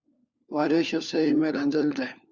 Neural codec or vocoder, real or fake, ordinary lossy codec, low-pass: codec, 16 kHz, 4 kbps, FunCodec, trained on LibriTTS, 50 frames a second; fake; Opus, 64 kbps; 7.2 kHz